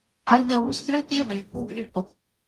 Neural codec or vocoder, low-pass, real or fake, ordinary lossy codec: codec, 44.1 kHz, 0.9 kbps, DAC; 14.4 kHz; fake; Opus, 32 kbps